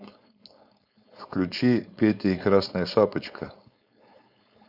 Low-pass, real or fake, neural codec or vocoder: 5.4 kHz; fake; codec, 16 kHz, 4.8 kbps, FACodec